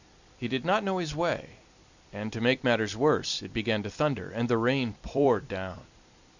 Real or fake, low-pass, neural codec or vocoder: fake; 7.2 kHz; vocoder, 44.1 kHz, 128 mel bands every 256 samples, BigVGAN v2